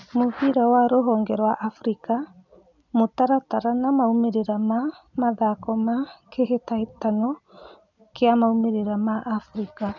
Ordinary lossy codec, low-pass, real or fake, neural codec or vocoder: none; 7.2 kHz; real; none